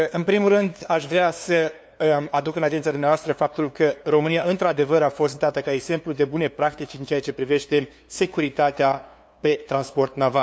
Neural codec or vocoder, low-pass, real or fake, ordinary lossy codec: codec, 16 kHz, 2 kbps, FunCodec, trained on LibriTTS, 25 frames a second; none; fake; none